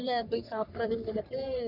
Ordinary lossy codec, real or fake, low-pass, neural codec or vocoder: none; fake; 5.4 kHz; codec, 44.1 kHz, 3.4 kbps, Pupu-Codec